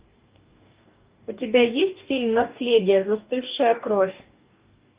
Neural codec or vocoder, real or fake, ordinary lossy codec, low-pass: codec, 44.1 kHz, 2.6 kbps, DAC; fake; Opus, 24 kbps; 3.6 kHz